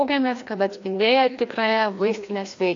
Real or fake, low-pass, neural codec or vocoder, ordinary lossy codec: fake; 7.2 kHz; codec, 16 kHz, 1 kbps, FreqCodec, larger model; AAC, 48 kbps